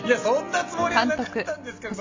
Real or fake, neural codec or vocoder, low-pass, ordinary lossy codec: real; none; 7.2 kHz; MP3, 48 kbps